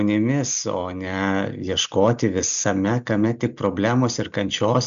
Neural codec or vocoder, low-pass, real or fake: none; 7.2 kHz; real